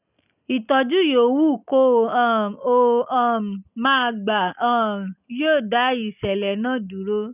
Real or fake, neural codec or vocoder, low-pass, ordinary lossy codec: real; none; 3.6 kHz; none